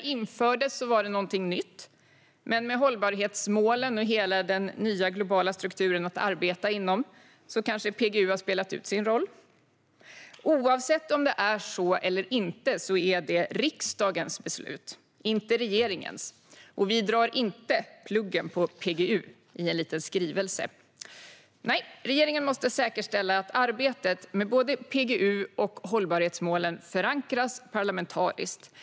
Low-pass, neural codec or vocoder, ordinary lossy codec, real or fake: none; none; none; real